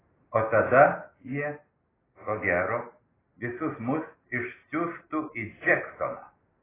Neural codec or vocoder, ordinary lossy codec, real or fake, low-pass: none; AAC, 16 kbps; real; 3.6 kHz